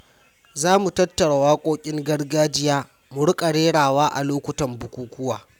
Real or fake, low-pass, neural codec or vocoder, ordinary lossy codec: real; 19.8 kHz; none; none